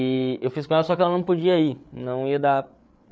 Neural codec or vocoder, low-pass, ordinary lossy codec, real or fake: codec, 16 kHz, 8 kbps, FreqCodec, larger model; none; none; fake